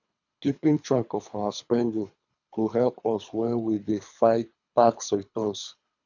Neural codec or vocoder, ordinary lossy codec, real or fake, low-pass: codec, 24 kHz, 3 kbps, HILCodec; none; fake; 7.2 kHz